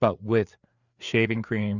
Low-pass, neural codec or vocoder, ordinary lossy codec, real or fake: 7.2 kHz; codec, 16 kHz, 4 kbps, FreqCodec, larger model; Opus, 64 kbps; fake